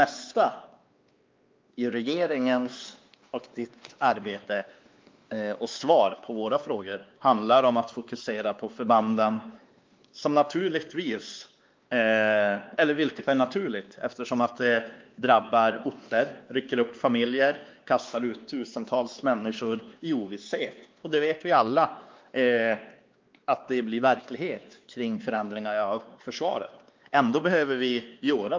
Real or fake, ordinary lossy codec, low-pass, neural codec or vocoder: fake; Opus, 24 kbps; 7.2 kHz; codec, 16 kHz, 2 kbps, X-Codec, WavLM features, trained on Multilingual LibriSpeech